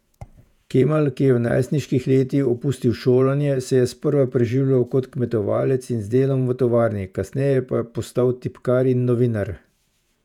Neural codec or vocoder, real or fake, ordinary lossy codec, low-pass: vocoder, 48 kHz, 128 mel bands, Vocos; fake; none; 19.8 kHz